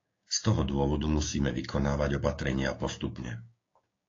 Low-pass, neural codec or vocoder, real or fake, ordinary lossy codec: 7.2 kHz; codec, 16 kHz, 6 kbps, DAC; fake; AAC, 32 kbps